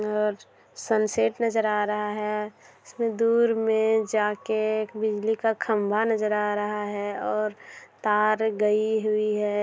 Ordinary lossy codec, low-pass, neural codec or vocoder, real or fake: none; none; none; real